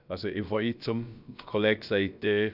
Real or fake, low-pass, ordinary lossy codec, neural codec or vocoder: fake; 5.4 kHz; none; codec, 16 kHz, about 1 kbps, DyCAST, with the encoder's durations